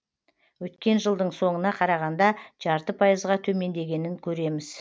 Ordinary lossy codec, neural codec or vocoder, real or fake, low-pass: none; none; real; none